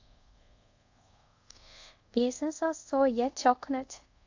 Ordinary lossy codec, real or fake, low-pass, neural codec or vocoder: AAC, 48 kbps; fake; 7.2 kHz; codec, 24 kHz, 0.5 kbps, DualCodec